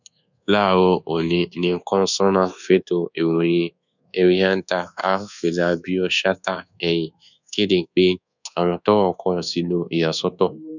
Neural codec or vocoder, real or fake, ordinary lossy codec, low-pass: codec, 24 kHz, 1.2 kbps, DualCodec; fake; none; 7.2 kHz